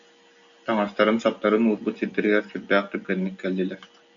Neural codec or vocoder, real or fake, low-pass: none; real; 7.2 kHz